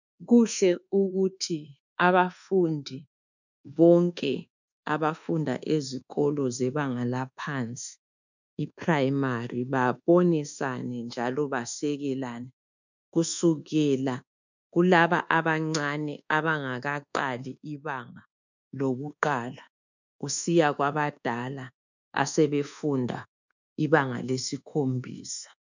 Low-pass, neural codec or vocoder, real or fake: 7.2 kHz; codec, 24 kHz, 1.2 kbps, DualCodec; fake